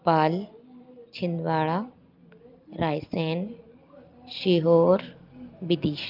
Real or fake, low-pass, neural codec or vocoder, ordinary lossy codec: real; 5.4 kHz; none; Opus, 24 kbps